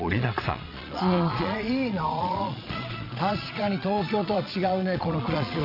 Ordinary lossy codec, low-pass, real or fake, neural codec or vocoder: none; 5.4 kHz; fake; vocoder, 22.05 kHz, 80 mel bands, Vocos